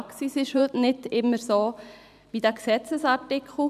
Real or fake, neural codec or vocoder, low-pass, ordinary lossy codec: fake; vocoder, 44.1 kHz, 128 mel bands every 512 samples, BigVGAN v2; 14.4 kHz; none